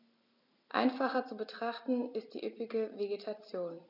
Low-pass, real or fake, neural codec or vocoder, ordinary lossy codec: 5.4 kHz; real; none; none